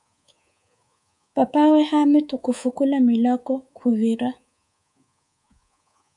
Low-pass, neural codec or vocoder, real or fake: 10.8 kHz; codec, 24 kHz, 3.1 kbps, DualCodec; fake